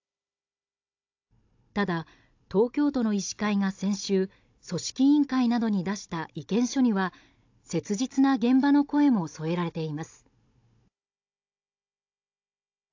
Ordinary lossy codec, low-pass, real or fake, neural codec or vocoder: AAC, 48 kbps; 7.2 kHz; fake; codec, 16 kHz, 16 kbps, FunCodec, trained on Chinese and English, 50 frames a second